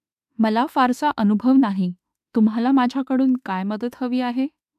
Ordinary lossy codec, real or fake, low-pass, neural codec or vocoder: AAC, 96 kbps; fake; 14.4 kHz; autoencoder, 48 kHz, 32 numbers a frame, DAC-VAE, trained on Japanese speech